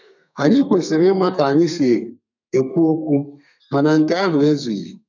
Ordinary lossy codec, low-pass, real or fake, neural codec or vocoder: none; 7.2 kHz; fake; codec, 32 kHz, 1.9 kbps, SNAC